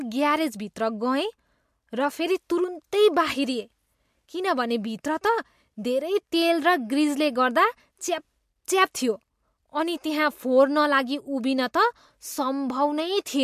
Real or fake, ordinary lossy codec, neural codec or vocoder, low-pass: real; MP3, 64 kbps; none; 14.4 kHz